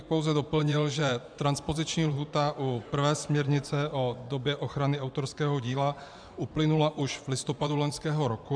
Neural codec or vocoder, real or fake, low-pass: vocoder, 24 kHz, 100 mel bands, Vocos; fake; 9.9 kHz